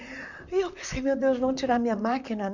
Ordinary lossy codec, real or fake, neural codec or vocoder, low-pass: none; fake; vocoder, 44.1 kHz, 128 mel bands, Pupu-Vocoder; 7.2 kHz